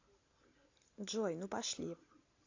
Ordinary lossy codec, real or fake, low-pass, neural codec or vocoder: AAC, 48 kbps; real; 7.2 kHz; none